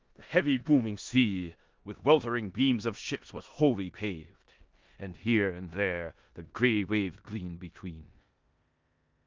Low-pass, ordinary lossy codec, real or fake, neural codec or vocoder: 7.2 kHz; Opus, 24 kbps; fake; codec, 16 kHz in and 24 kHz out, 0.9 kbps, LongCat-Audio-Codec, four codebook decoder